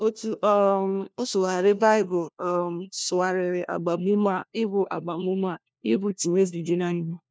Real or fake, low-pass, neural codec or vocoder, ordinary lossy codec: fake; none; codec, 16 kHz, 1 kbps, FunCodec, trained on LibriTTS, 50 frames a second; none